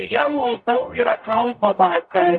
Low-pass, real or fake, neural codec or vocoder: 9.9 kHz; fake; codec, 44.1 kHz, 0.9 kbps, DAC